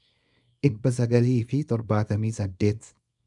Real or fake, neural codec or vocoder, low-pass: fake; codec, 24 kHz, 0.9 kbps, WavTokenizer, small release; 10.8 kHz